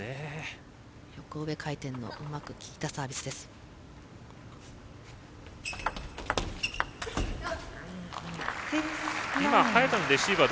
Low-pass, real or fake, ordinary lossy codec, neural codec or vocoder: none; real; none; none